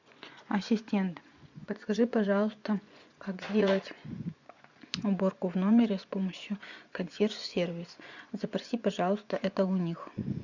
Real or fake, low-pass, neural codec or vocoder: fake; 7.2 kHz; vocoder, 24 kHz, 100 mel bands, Vocos